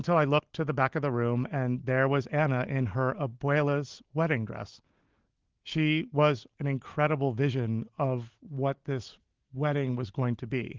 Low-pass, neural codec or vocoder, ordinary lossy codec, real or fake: 7.2 kHz; none; Opus, 16 kbps; real